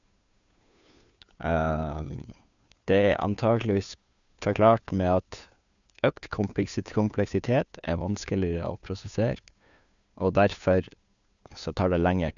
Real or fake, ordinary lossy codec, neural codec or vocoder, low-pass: fake; none; codec, 16 kHz, 2 kbps, FunCodec, trained on Chinese and English, 25 frames a second; 7.2 kHz